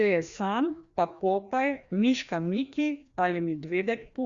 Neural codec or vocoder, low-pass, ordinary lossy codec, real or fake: codec, 16 kHz, 1 kbps, FreqCodec, larger model; 7.2 kHz; Opus, 64 kbps; fake